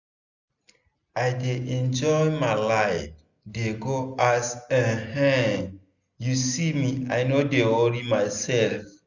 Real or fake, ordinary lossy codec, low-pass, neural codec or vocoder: real; none; 7.2 kHz; none